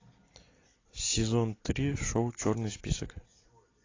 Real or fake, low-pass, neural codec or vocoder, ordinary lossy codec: real; 7.2 kHz; none; AAC, 32 kbps